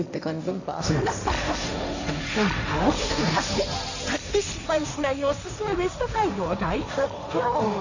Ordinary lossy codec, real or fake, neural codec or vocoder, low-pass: none; fake; codec, 16 kHz, 1.1 kbps, Voila-Tokenizer; 7.2 kHz